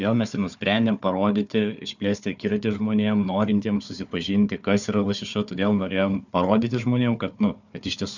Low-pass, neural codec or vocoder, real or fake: 7.2 kHz; codec, 16 kHz, 4 kbps, FunCodec, trained on Chinese and English, 50 frames a second; fake